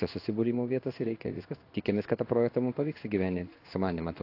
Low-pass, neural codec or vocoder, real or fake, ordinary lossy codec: 5.4 kHz; codec, 16 kHz in and 24 kHz out, 1 kbps, XY-Tokenizer; fake; AAC, 32 kbps